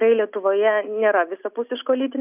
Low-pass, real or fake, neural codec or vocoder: 3.6 kHz; real; none